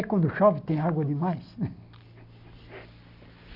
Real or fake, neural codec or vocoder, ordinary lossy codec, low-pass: real; none; none; 5.4 kHz